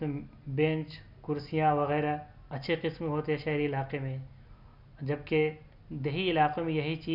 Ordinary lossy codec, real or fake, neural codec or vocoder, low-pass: none; real; none; 5.4 kHz